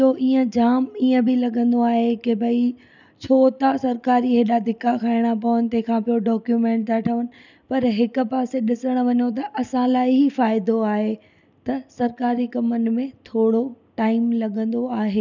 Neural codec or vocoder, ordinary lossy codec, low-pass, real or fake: none; none; 7.2 kHz; real